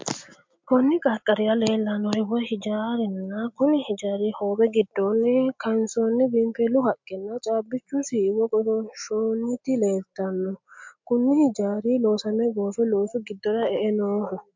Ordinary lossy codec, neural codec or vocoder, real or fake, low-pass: MP3, 48 kbps; none; real; 7.2 kHz